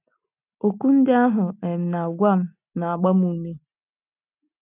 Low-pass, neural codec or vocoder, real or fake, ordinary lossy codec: 3.6 kHz; none; real; none